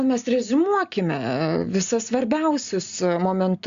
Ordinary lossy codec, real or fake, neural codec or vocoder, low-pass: Opus, 64 kbps; real; none; 7.2 kHz